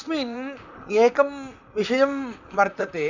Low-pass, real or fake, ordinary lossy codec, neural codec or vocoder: 7.2 kHz; fake; none; vocoder, 44.1 kHz, 128 mel bands, Pupu-Vocoder